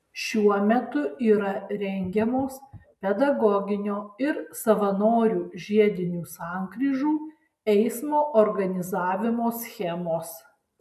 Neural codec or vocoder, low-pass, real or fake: none; 14.4 kHz; real